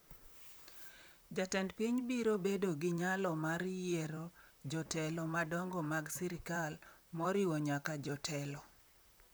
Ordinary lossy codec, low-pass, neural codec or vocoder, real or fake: none; none; vocoder, 44.1 kHz, 128 mel bands, Pupu-Vocoder; fake